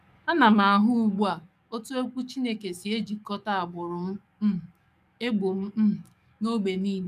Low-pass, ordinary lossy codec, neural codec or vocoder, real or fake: 14.4 kHz; none; codec, 44.1 kHz, 7.8 kbps, DAC; fake